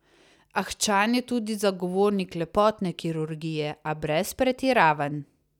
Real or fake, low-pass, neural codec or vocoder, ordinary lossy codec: real; 19.8 kHz; none; none